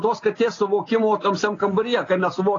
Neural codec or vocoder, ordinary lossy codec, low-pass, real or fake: none; AAC, 32 kbps; 7.2 kHz; real